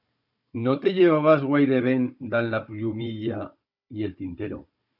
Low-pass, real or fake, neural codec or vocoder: 5.4 kHz; fake; codec, 16 kHz, 16 kbps, FunCodec, trained on Chinese and English, 50 frames a second